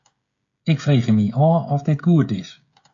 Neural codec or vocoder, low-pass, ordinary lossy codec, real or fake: codec, 16 kHz, 16 kbps, FreqCodec, smaller model; 7.2 kHz; AAC, 48 kbps; fake